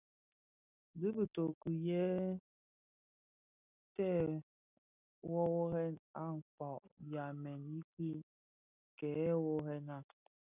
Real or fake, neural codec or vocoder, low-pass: real; none; 3.6 kHz